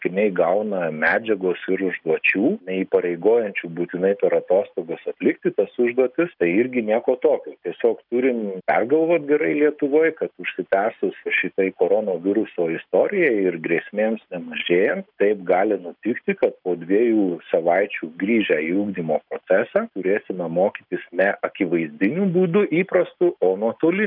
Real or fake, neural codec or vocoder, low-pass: real; none; 5.4 kHz